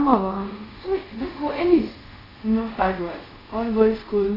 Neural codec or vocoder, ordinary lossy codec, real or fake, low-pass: codec, 24 kHz, 0.5 kbps, DualCodec; AAC, 24 kbps; fake; 5.4 kHz